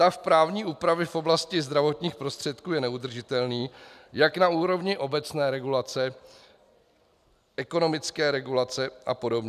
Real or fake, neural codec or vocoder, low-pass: real; none; 14.4 kHz